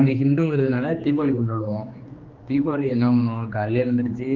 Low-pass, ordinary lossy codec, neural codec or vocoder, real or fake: 7.2 kHz; Opus, 32 kbps; codec, 16 kHz, 2 kbps, X-Codec, HuBERT features, trained on general audio; fake